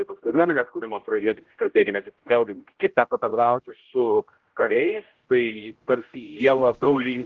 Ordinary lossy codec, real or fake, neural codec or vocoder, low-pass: Opus, 16 kbps; fake; codec, 16 kHz, 0.5 kbps, X-Codec, HuBERT features, trained on general audio; 7.2 kHz